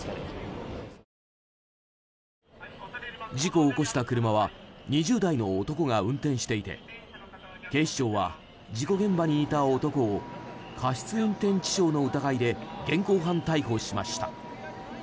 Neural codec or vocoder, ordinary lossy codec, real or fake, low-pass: none; none; real; none